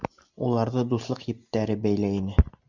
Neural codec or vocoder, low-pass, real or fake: none; 7.2 kHz; real